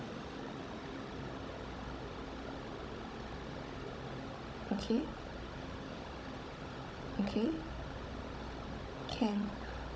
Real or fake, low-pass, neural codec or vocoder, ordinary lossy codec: fake; none; codec, 16 kHz, 16 kbps, FunCodec, trained on Chinese and English, 50 frames a second; none